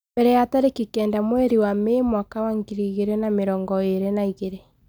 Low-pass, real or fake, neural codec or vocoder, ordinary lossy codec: none; real; none; none